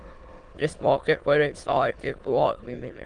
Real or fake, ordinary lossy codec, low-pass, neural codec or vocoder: fake; Opus, 24 kbps; 9.9 kHz; autoencoder, 22.05 kHz, a latent of 192 numbers a frame, VITS, trained on many speakers